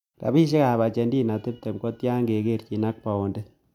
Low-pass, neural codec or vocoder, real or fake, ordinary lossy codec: 19.8 kHz; none; real; none